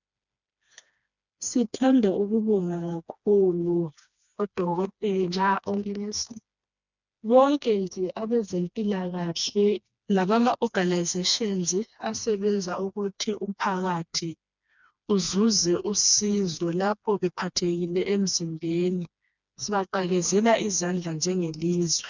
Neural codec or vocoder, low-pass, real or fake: codec, 16 kHz, 2 kbps, FreqCodec, smaller model; 7.2 kHz; fake